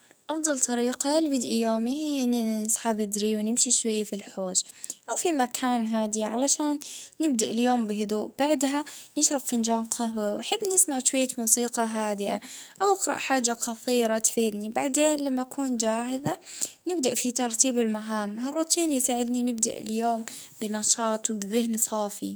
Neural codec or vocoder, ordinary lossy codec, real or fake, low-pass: codec, 44.1 kHz, 2.6 kbps, SNAC; none; fake; none